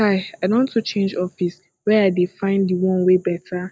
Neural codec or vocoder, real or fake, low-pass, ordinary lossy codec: none; real; none; none